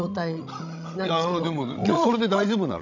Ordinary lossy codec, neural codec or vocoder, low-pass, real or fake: none; codec, 16 kHz, 16 kbps, FreqCodec, larger model; 7.2 kHz; fake